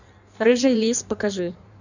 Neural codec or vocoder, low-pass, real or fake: codec, 16 kHz in and 24 kHz out, 1.1 kbps, FireRedTTS-2 codec; 7.2 kHz; fake